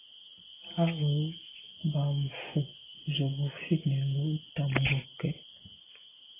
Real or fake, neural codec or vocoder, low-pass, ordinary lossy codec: real; none; 3.6 kHz; AAC, 16 kbps